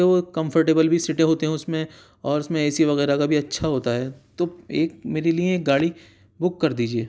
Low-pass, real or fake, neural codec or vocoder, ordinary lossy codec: none; real; none; none